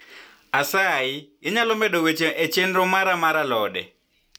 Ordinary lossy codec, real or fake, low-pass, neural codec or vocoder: none; real; none; none